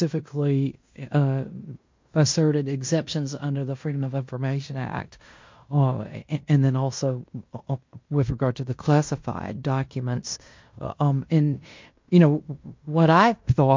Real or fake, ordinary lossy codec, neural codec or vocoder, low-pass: fake; MP3, 48 kbps; codec, 16 kHz in and 24 kHz out, 0.9 kbps, LongCat-Audio-Codec, fine tuned four codebook decoder; 7.2 kHz